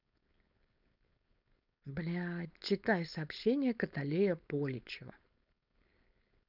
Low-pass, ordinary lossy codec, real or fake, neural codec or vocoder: 5.4 kHz; none; fake; codec, 16 kHz, 4.8 kbps, FACodec